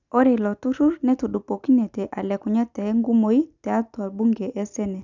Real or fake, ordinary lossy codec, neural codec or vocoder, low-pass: real; none; none; 7.2 kHz